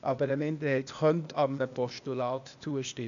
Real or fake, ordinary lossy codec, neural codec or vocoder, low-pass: fake; MP3, 96 kbps; codec, 16 kHz, 0.8 kbps, ZipCodec; 7.2 kHz